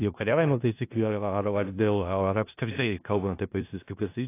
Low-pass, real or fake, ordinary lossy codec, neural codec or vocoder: 3.6 kHz; fake; AAC, 24 kbps; codec, 16 kHz in and 24 kHz out, 0.4 kbps, LongCat-Audio-Codec, four codebook decoder